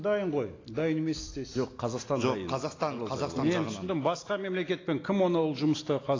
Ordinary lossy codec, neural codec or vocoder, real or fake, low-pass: AAC, 32 kbps; none; real; 7.2 kHz